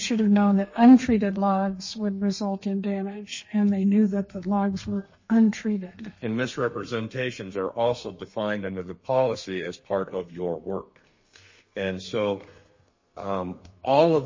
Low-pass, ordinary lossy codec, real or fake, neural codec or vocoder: 7.2 kHz; MP3, 32 kbps; fake; codec, 32 kHz, 1.9 kbps, SNAC